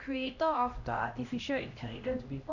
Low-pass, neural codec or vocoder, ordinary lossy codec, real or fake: 7.2 kHz; codec, 16 kHz, 1 kbps, X-Codec, HuBERT features, trained on LibriSpeech; none; fake